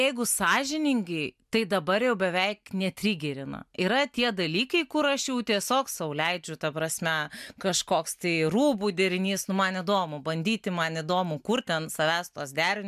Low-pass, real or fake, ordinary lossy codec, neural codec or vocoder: 14.4 kHz; real; MP3, 96 kbps; none